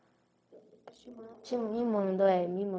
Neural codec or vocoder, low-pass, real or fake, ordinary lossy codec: codec, 16 kHz, 0.4 kbps, LongCat-Audio-Codec; none; fake; none